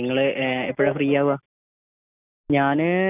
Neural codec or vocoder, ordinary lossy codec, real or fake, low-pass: none; none; real; 3.6 kHz